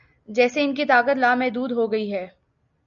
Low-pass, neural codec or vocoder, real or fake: 7.2 kHz; none; real